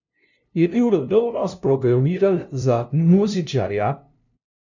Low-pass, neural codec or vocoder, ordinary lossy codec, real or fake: 7.2 kHz; codec, 16 kHz, 0.5 kbps, FunCodec, trained on LibriTTS, 25 frames a second; none; fake